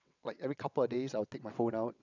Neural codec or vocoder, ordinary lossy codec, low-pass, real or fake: vocoder, 22.05 kHz, 80 mel bands, WaveNeXt; none; 7.2 kHz; fake